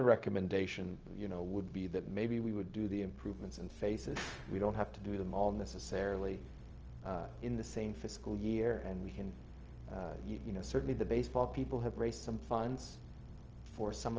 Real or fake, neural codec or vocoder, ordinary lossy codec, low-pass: fake; codec, 16 kHz, 0.4 kbps, LongCat-Audio-Codec; Opus, 24 kbps; 7.2 kHz